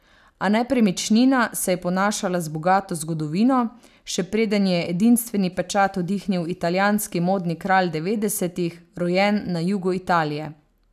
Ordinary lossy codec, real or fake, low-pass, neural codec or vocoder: none; real; 14.4 kHz; none